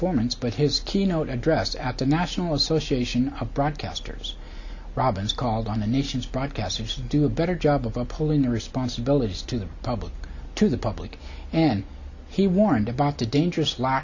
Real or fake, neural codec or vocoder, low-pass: real; none; 7.2 kHz